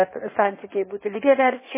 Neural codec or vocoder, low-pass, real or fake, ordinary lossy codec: codec, 16 kHz in and 24 kHz out, 1.1 kbps, FireRedTTS-2 codec; 3.6 kHz; fake; MP3, 16 kbps